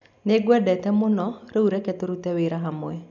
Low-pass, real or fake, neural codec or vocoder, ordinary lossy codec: 7.2 kHz; real; none; none